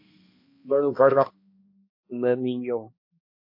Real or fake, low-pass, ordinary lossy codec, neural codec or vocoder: fake; 5.4 kHz; MP3, 24 kbps; codec, 16 kHz, 1 kbps, X-Codec, HuBERT features, trained on balanced general audio